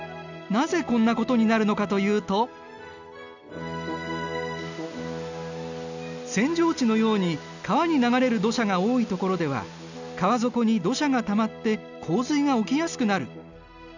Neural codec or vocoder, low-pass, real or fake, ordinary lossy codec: none; 7.2 kHz; real; none